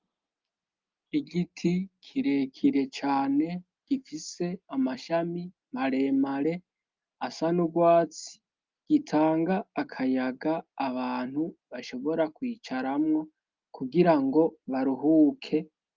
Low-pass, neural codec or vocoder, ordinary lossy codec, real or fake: 7.2 kHz; none; Opus, 32 kbps; real